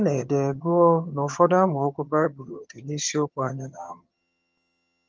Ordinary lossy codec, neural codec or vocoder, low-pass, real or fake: Opus, 24 kbps; vocoder, 22.05 kHz, 80 mel bands, HiFi-GAN; 7.2 kHz; fake